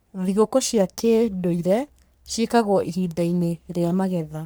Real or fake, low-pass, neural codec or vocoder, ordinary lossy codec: fake; none; codec, 44.1 kHz, 3.4 kbps, Pupu-Codec; none